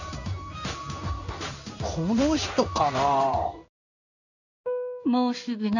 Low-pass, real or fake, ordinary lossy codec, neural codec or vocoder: 7.2 kHz; fake; none; codec, 16 kHz in and 24 kHz out, 1 kbps, XY-Tokenizer